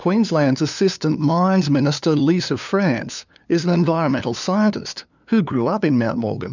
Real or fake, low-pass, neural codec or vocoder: fake; 7.2 kHz; codec, 16 kHz, 2 kbps, FunCodec, trained on LibriTTS, 25 frames a second